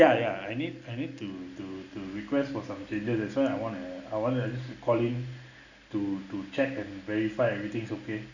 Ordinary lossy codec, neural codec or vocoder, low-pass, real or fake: none; none; 7.2 kHz; real